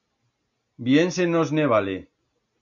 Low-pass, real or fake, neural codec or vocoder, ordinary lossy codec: 7.2 kHz; real; none; MP3, 64 kbps